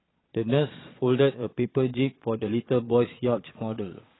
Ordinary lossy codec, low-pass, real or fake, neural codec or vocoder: AAC, 16 kbps; 7.2 kHz; fake; vocoder, 22.05 kHz, 80 mel bands, Vocos